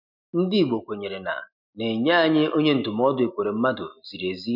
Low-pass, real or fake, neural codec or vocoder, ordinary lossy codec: 5.4 kHz; real; none; none